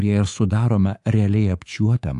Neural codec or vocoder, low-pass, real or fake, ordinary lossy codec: codec, 24 kHz, 3.1 kbps, DualCodec; 10.8 kHz; fake; AAC, 64 kbps